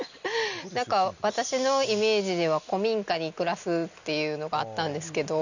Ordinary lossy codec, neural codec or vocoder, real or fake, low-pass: none; none; real; 7.2 kHz